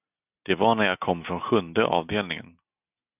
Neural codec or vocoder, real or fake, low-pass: none; real; 3.6 kHz